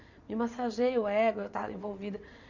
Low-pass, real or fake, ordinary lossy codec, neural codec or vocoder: 7.2 kHz; fake; none; vocoder, 22.05 kHz, 80 mel bands, WaveNeXt